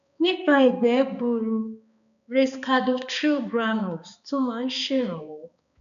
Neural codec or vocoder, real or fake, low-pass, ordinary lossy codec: codec, 16 kHz, 2 kbps, X-Codec, HuBERT features, trained on balanced general audio; fake; 7.2 kHz; none